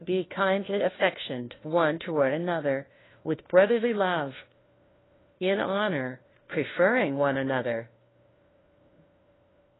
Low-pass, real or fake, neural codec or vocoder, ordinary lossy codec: 7.2 kHz; fake; codec, 16 kHz, 1 kbps, FunCodec, trained on LibriTTS, 50 frames a second; AAC, 16 kbps